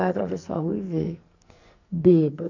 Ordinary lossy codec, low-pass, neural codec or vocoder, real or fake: none; 7.2 kHz; codec, 44.1 kHz, 2.6 kbps, SNAC; fake